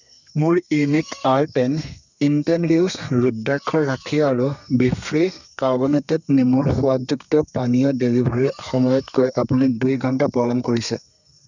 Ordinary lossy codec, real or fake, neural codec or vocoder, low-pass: none; fake; codec, 32 kHz, 1.9 kbps, SNAC; 7.2 kHz